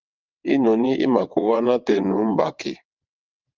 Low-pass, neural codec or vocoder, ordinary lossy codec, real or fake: 7.2 kHz; vocoder, 22.05 kHz, 80 mel bands, WaveNeXt; Opus, 24 kbps; fake